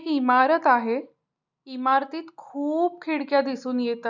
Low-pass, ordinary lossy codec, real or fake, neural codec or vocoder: 7.2 kHz; none; real; none